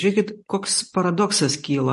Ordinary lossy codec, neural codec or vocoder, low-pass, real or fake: MP3, 48 kbps; none; 14.4 kHz; real